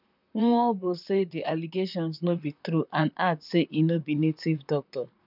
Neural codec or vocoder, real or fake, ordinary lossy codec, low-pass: vocoder, 44.1 kHz, 128 mel bands, Pupu-Vocoder; fake; none; 5.4 kHz